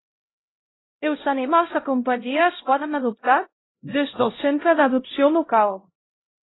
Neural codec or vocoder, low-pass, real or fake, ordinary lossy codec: codec, 16 kHz, 0.5 kbps, X-Codec, HuBERT features, trained on LibriSpeech; 7.2 kHz; fake; AAC, 16 kbps